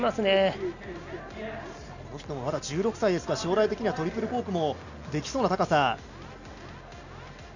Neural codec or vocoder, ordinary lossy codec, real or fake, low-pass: none; none; real; 7.2 kHz